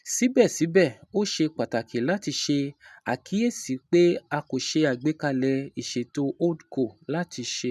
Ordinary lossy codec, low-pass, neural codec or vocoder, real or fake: none; 14.4 kHz; none; real